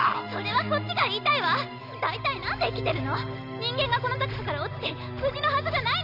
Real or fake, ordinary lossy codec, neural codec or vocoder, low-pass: real; none; none; 5.4 kHz